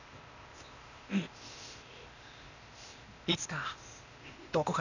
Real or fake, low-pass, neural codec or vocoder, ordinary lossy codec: fake; 7.2 kHz; codec, 16 kHz, 0.8 kbps, ZipCodec; none